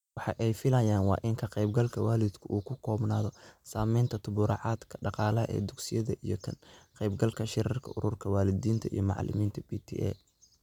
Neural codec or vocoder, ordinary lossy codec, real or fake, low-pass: none; none; real; 19.8 kHz